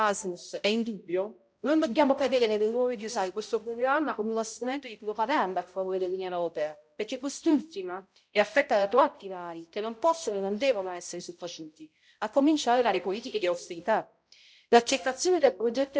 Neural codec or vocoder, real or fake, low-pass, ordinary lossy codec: codec, 16 kHz, 0.5 kbps, X-Codec, HuBERT features, trained on balanced general audio; fake; none; none